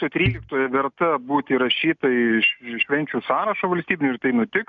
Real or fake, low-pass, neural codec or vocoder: real; 7.2 kHz; none